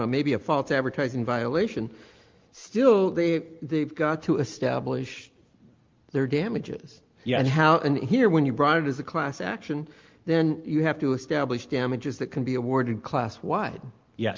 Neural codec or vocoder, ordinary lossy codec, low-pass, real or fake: none; Opus, 32 kbps; 7.2 kHz; real